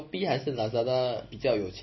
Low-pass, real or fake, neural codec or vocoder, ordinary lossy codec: 7.2 kHz; real; none; MP3, 24 kbps